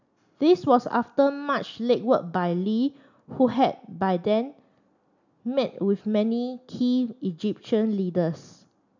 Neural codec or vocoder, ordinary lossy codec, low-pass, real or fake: none; none; 7.2 kHz; real